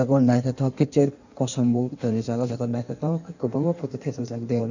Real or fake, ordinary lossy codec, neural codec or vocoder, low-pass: fake; none; codec, 16 kHz in and 24 kHz out, 1.1 kbps, FireRedTTS-2 codec; 7.2 kHz